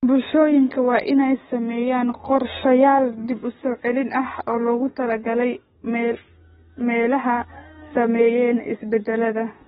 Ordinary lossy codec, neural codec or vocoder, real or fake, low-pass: AAC, 16 kbps; codec, 44.1 kHz, 7.8 kbps, DAC; fake; 19.8 kHz